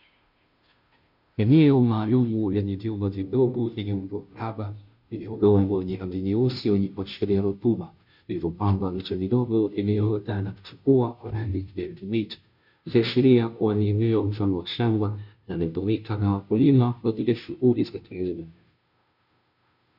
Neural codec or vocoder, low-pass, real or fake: codec, 16 kHz, 0.5 kbps, FunCodec, trained on Chinese and English, 25 frames a second; 5.4 kHz; fake